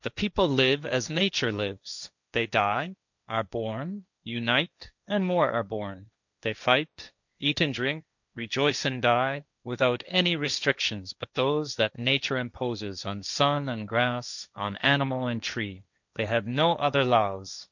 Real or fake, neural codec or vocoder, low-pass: fake; codec, 16 kHz, 1.1 kbps, Voila-Tokenizer; 7.2 kHz